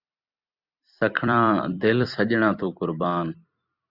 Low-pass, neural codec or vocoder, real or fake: 5.4 kHz; vocoder, 44.1 kHz, 128 mel bands every 256 samples, BigVGAN v2; fake